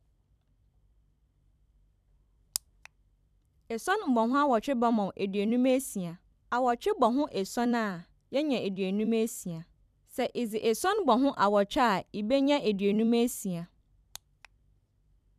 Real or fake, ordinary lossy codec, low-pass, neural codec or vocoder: fake; none; 14.4 kHz; vocoder, 44.1 kHz, 128 mel bands every 256 samples, BigVGAN v2